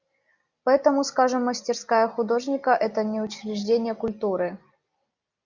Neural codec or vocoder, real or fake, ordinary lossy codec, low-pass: none; real; Opus, 64 kbps; 7.2 kHz